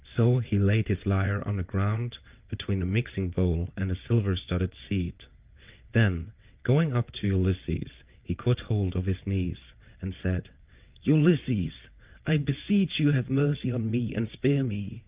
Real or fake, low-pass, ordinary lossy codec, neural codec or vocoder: fake; 3.6 kHz; Opus, 24 kbps; vocoder, 22.05 kHz, 80 mel bands, WaveNeXt